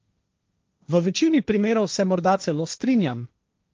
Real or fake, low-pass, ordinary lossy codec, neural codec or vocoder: fake; 7.2 kHz; Opus, 24 kbps; codec, 16 kHz, 1.1 kbps, Voila-Tokenizer